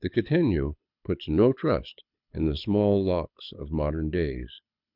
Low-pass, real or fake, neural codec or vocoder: 5.4 kHz; fake; codec, 24 kHz, 6 kbps, HILCodec